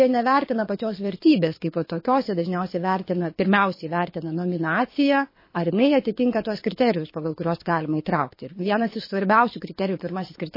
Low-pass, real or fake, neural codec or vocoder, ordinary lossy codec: 5.4 kHz; fake; codec, 24 kHz, 6 kbps, HILCodec; MP3, 24 kbps